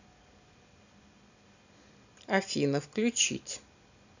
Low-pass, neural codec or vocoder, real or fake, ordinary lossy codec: 7.2 kHz; none; real; none